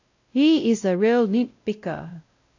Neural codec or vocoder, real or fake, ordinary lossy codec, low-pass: codec, 16 kHz, 0.5 kbps, X-Codec, WavLM features, trained on Multilingual LibriSpeech; fake; AAC, 48 kbps; 7.2 kHz